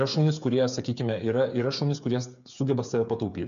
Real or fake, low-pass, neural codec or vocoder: fake; 7.2 kHz; codec, 16 kHz, 8 kbps, FreqCodec, smaller model